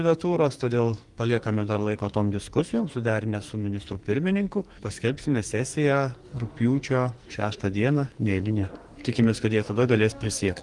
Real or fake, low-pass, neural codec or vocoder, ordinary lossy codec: fake; 10.8 kHz; codec, 44.1 kHz, 2.6 kbps, SNAC; Opus, 24 kbps